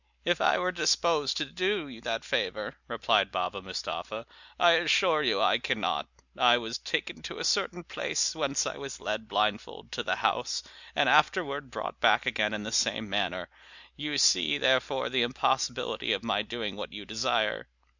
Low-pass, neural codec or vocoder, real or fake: 7.2 kHz; none; real